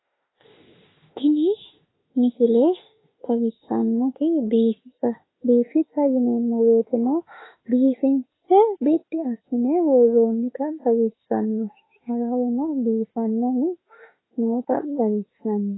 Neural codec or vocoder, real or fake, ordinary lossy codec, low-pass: autoencoder, 48 kHz, 32 numbers a frame, DAC-VAE, trained on Japanese speech; fake; AAC, 16 kbps; 7.2 kHz